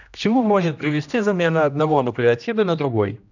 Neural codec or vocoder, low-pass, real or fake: codec, 16 kHz, 1 kbps, X-Codec, HuBERT features, trained on general audio; 7.2 kHz; fake